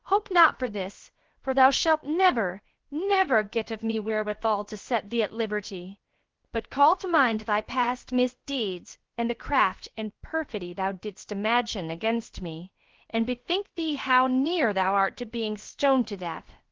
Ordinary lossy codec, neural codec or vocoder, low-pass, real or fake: Opus, 16 kbps; codec, 16 kHz, about 1 kbps, DyCAST, with the encoder's durations; 7.2 kHz; fake